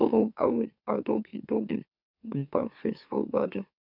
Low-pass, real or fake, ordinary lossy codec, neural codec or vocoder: 5.4 kHz; fake; none; autoencoder, 44.1 kHz, a latent of 192 numbers a frame, MeloTTS